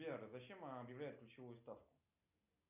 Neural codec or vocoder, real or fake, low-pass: none; real; 3.6 kHz